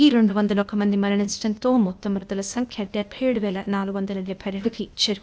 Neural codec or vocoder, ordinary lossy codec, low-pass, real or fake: codec, 16 kHz, 0.8 kbps, ZipCodec; none; none; fake